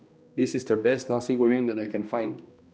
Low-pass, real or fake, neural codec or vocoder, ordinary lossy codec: none; fake; codec, 16 kHz, 1 kbps, X-Codec, HuBERT features, trained on balanced general audio; none